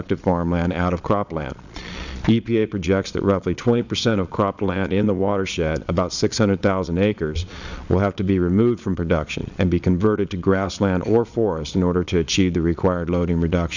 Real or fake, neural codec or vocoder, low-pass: fake; vocoder, 22.05 kHz, 80 mel bands, Vocos; 7.2 kHz